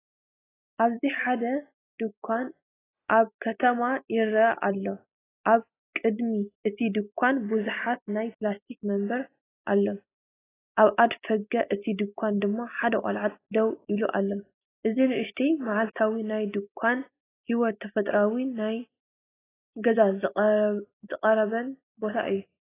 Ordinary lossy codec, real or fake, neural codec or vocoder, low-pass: AAC, 16 kbps; real; none; 3.6 kHz